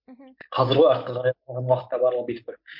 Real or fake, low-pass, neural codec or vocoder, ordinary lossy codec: real; 5.4 kHz; none; MP3, 48 kbps